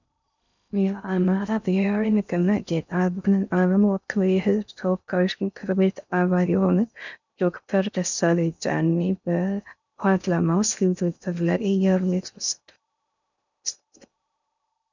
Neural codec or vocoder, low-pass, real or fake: codec, 16 kHz in and 24 kHz out, 0.6 kbps, FocalCodec, streaming, 2048 codes; 7.2 kHz; fake